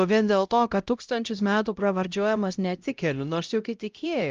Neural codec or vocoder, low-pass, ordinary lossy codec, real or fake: codec, 16 kHz, 0.5 kbps, X-Codec, HuBERT features, trained on LibriSpeech; 7.2 kHz; Opus, 24 kbps; fake